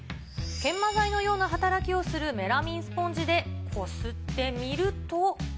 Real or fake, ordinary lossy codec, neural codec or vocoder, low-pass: real; none; none; none